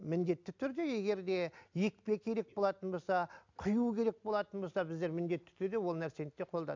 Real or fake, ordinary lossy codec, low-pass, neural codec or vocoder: real; MP3, 64 kbps; 7.2 kHz; none